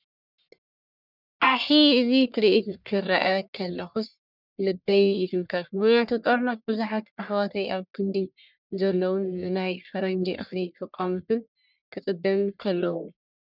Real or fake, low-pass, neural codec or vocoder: fake; 5.4 kHz; codec, 44.1 kHz, 1.7 kbps, Pupu-Codec